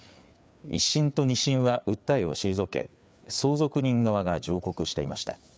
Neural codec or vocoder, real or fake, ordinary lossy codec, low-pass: codec, 16 kHz, 4 kbps, FreqCodec, larger model; fake; none; none